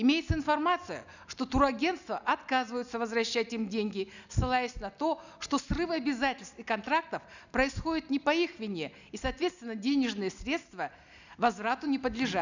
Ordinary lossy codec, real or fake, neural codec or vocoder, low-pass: none; real; none; 7.2 kHz